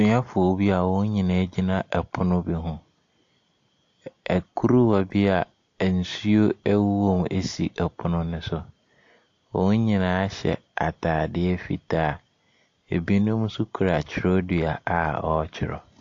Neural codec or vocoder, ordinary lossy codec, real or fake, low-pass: none; AAC, 48 kbps; real; 7.2 kHz